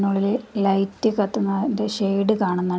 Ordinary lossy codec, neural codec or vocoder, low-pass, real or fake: none; none; none; real